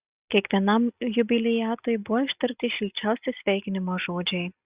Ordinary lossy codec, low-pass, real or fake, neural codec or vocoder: Opus, 64 kbps; 3.6 kHz; real; none